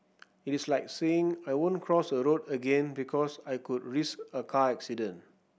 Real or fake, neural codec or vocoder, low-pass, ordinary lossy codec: real; none; none; none